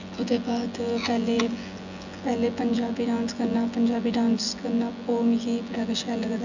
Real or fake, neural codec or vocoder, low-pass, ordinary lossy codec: fake; vocoder, 24 kHz, 100 mel bands, Vocos; 7.2 kHz; none